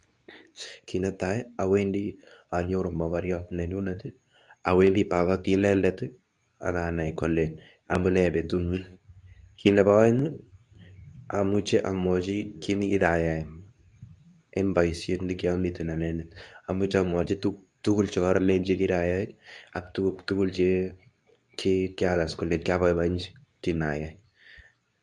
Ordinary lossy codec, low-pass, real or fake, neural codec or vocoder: MP3, 96 kbps; 10.8 kHz; fake; codec, 24 kHz, 0.9 kbps, WavTokenizer, medium speech release version 2